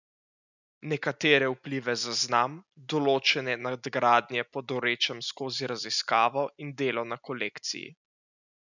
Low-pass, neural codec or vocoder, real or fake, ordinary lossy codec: 7.2 kHz; none; real; none